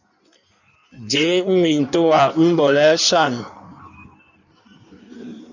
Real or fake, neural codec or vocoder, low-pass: fake; codec, 16 kHz in and 24 kHz out, 1.1 kbps, FireRedTTS-2 codec; 7.2 kHz